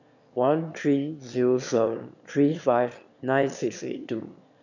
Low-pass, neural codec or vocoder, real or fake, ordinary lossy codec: 7.2 kHz; autoencoder, 22.05 kHz, a latent of 192 numbers a frame, VITS, trained on one speaker; fake; none